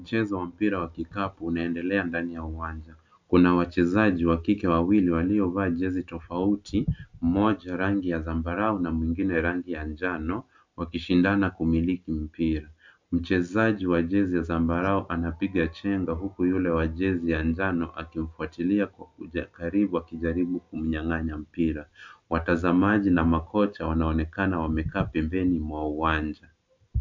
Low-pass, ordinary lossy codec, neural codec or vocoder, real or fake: 7.2 kHz; MP3, 64 kbps; none; real